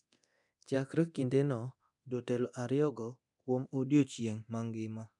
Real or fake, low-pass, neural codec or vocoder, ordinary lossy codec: fake; 10.8 kHz; codec, 24 kHz, 0.9 kbps, DualCodec; none